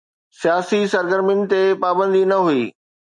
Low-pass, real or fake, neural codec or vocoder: 10.8 kHz; real; none